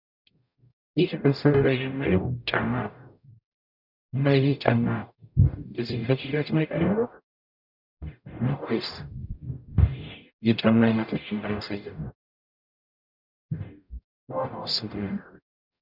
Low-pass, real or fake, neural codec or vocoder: 5.4 kHz; fake; codec, 44.1 kHz, 0.9 kbps, DAC